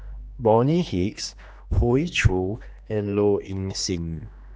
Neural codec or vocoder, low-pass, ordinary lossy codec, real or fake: codec, 16 kHz, 2 kbps, X-Codec, HuBERT features, trained on general audio; none; none; fake